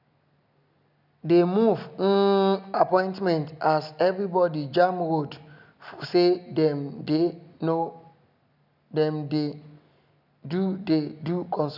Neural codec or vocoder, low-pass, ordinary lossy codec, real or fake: none; 5.4 kHz; none; real